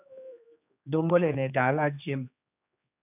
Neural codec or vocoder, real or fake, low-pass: codec, 16 kHz, 2 kbps, X-Codec, HuBERT features, trained on general audio; fake; 3.6 kHz